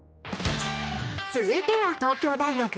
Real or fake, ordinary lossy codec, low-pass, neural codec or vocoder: fake; none; none; codec, 16 kHz, 1 kbps, X-Codec, HuBERT features, trained on general audio